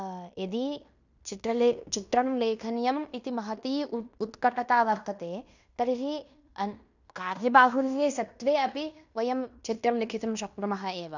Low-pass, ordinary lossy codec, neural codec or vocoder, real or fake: 7.2 kHz; none; codec, 16 kHz in and 24 kHz out, 0.9 kbps, LongCat-Audio-Codec, fine tuned four codebook decoder; fake